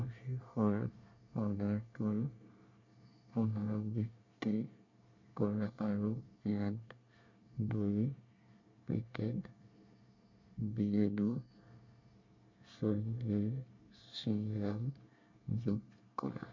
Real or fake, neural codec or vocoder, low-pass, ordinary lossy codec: fake; codec, 24 kHz, 1 kbps, SNAC; 7.2 kHz; MP3, 48 kbps